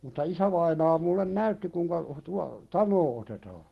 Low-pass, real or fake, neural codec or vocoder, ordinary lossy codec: 14.4 kHz; real; none; Opus, 16 kbps